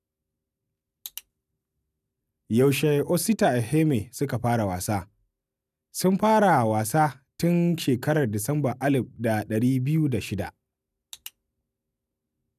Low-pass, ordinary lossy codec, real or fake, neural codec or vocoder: 14.4 kHz; none; real; none